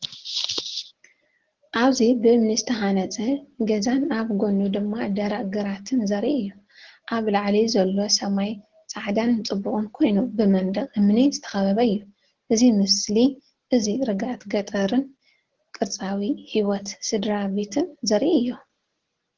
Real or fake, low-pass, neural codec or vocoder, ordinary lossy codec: real; 7.2 kHz; none; Opus, 16 kbps